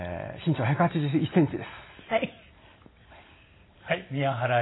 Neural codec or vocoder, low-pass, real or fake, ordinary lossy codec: none; 7.2 kHz; real; AAC, 16 kbps